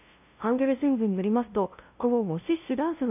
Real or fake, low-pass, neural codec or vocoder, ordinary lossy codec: fake; 3.6 kHz; codec, 16 kHz, 0.5 kbps, FunCodec, trained on LibriTTS, 25 frames a second; none